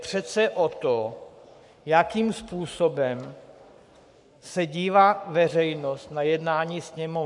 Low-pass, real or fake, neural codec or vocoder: 10.8 kHz; fake; codec, 44.1 kHz, 7.8 kbps, Pupu-Codec